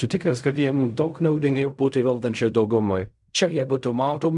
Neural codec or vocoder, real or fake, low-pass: codec, 16 kHz in and 24 kHz out, 0.4 kbps, LongCat-Audio-Codec, fine tuned four codebook decoder; fake; 10.8 kHz